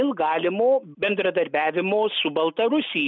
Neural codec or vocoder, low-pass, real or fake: none; 7.2 kHz; real